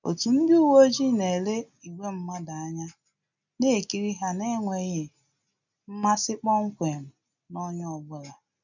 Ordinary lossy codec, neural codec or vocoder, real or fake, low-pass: none; none; real; 7.2 kHz